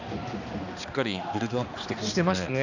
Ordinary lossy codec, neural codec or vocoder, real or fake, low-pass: none; codec, 16 kHz, 2 kbps, X-Codec, HuBERT features, trained on balanced general audio; fake; 7.2 kHz